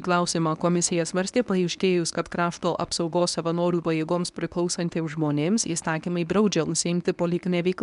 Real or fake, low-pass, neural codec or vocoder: fake; 10.8 kHz; codec, 24 kHz, 0.9 kbps, WavTokenizer, medium speech release version 1